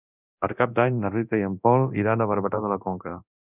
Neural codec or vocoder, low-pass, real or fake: codec, 24 kHz, 0.9 kbps, DualCodec; 3.6 kHz; fake